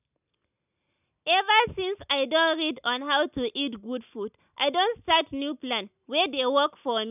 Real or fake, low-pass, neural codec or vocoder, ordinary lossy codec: real; 3.6 kHz; none; none